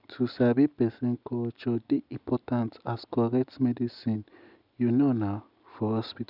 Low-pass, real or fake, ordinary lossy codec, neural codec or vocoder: 5.4 kHz; real; none; none